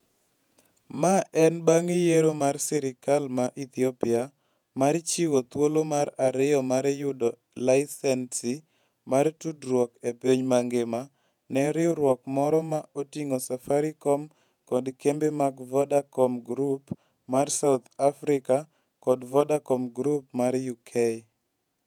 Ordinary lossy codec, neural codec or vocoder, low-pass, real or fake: none; vocoder, 48 kHz, 128 mel bands, Vocos; 19.8 kHz; fake